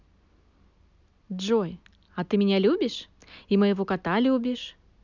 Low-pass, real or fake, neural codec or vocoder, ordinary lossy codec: 7.2 kHz; real; none; none